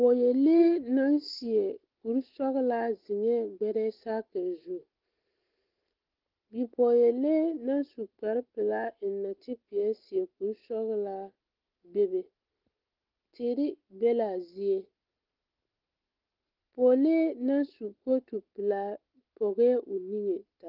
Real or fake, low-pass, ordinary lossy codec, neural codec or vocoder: real; 5.4 kHz; Opus, 16 kbps; none